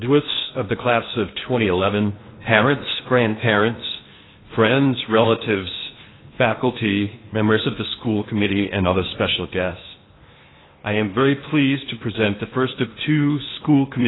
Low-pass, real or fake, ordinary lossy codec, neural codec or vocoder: 7.2 kHz; fake; AAC, 16 kbps; codec, 16 kHz in and 24 kHz out, 0.8 kbps, FocalCodec, streaming, 65536 codes